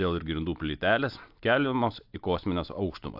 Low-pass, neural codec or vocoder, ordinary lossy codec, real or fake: 5.4 kHz; none; AAC, 48 kbps; real